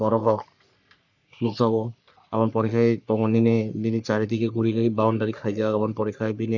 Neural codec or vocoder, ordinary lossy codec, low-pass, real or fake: codec, 44.1 kHz, 3.4 kbps, Pupu-Codec; none; 7.2 kHz; fake